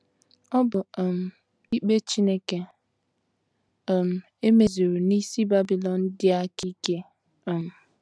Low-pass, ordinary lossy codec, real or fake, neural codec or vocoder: none; none; real; none